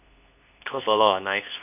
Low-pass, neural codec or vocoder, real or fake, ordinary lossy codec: 3.6 kHz; codec, 24 kHz, 0.9 kbps, WavTokenizer, medium speech release version 2; fake; none